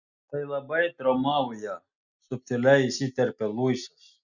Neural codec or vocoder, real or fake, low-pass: none; real; 7.2 kHz